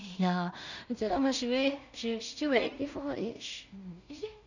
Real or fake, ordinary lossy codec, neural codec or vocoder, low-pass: fake; none; codec, 16 kHz in and 24 kHz out, 0.4 kbps, LongCat-Audio-Codec, two codebook decoder; 7.2 kHz